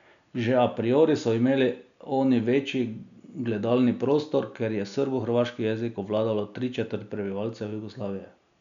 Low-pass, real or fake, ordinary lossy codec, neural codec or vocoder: 7.2 kHz; real; none; none